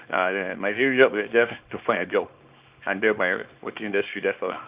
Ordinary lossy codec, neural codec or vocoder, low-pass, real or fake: Opus, 24 kbps; codec, 24 kHz, 0.9 kbps, WavTokenizer, small release; 3.6 kHz; fake